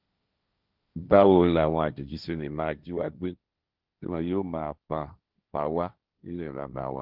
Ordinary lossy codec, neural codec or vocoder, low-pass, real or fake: Opus, 32 kbps; codec, 16 kHz, 1.1 kbps, Voila-Tokenizer; 5.4 kHz; fake